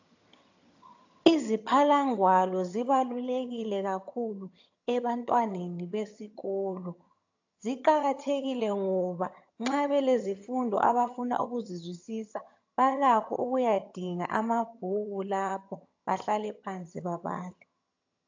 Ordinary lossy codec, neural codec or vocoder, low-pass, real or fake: AAC, 48 kbps; vocoder, 22.05 kHz, 80 mel bands, HiFi-GAN; 7.2 kHz; fake